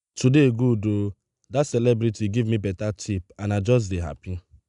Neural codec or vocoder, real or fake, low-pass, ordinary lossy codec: none; real; 10.8 kHz; none